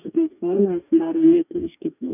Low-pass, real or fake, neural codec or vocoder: 3.6 kHz; fake; codec, 44.1 kHz, 2.6 kbps, DAC